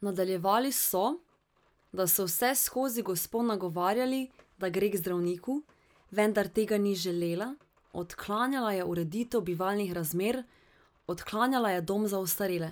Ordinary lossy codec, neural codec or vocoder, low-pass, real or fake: none; none; none; real